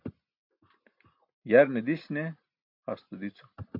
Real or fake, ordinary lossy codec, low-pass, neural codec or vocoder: real; MP3, 48 kbps; 5.4 kHz; none